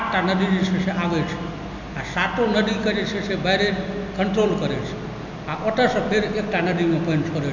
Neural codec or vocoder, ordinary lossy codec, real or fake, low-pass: none; none; real; 7.2 kHz